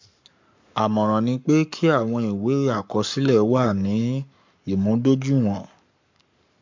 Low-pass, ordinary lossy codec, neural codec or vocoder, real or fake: 7.2 kHz; MP3, 64 kbps; codec, 44.1 kHz, 7.8 kbps, Pupu-Codec; fake